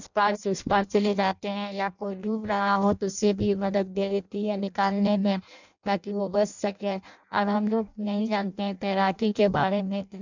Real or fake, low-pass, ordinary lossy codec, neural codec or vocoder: fake; 7.2 kHz; none; codec, 16 kHz in and 24 kHz out, 0.6 kbps, FireRedTTS-2 codec